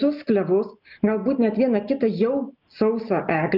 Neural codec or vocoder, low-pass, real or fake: none; 5.4 kHz; real